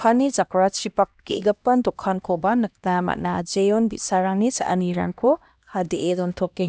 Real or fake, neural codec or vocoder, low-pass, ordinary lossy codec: fake; codec, 16 kHz, 1 kbps, X-Codec, HuBERT features, trained on LibriSpeech; none; none